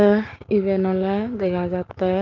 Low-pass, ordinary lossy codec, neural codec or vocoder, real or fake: 7.2 kHz; Opus, 32 kbps; codec, 16 kHz, 6 kbps, DAC; fake